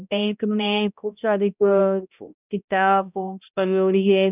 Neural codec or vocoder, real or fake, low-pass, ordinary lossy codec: codec, 16 kHz, 0.5 kbps, X-Codec, HuBERT features, trained on balanced general audio; fake; 3.6 kHz; none